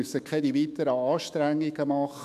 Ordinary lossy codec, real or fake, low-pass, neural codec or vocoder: MP3, 96 kbps; fake; 14.4 kHz; autoencoder, 48 kHz, 128 numbers a frame, DAC-VAE, trained on Japanese speech